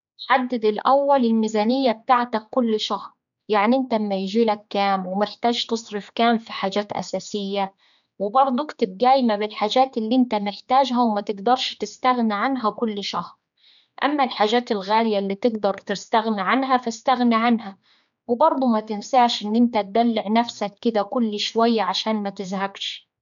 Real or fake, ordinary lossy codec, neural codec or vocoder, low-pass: fake; none; codec, 16 kHz, 4 kbps, X-Codec, HuBERT features, trained on general audio; 7.2 kHz